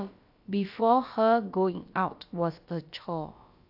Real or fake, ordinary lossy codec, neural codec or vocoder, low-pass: fake; AAC, 48 kbps; codec, 16 kHz, about 1 kbps, DyCAST, with the encoder's durations; 5.4 kHz